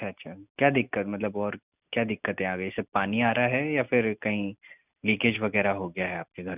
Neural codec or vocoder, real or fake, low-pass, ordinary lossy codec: none; real; 3.6 kHz; none